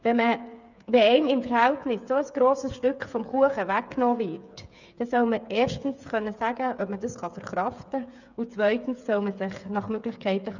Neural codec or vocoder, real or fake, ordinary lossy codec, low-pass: codec, 16 kHz, 8 kbps, FreqCodec, smaller model; fake; none; 7.2 kHz